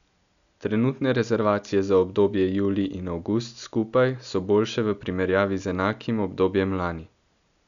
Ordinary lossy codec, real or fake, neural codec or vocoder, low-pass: none; real; none; 7.2 kHz